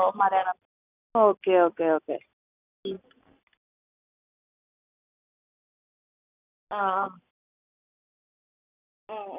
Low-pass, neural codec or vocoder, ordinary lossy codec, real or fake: 3.6 kHz; none; none; real